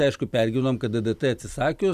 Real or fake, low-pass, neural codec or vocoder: real; 14.4 kHz; none